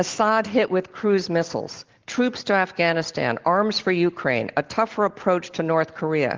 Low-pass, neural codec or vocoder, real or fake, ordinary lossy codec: 7.2 kHz; none; real; Opus, 16 kbps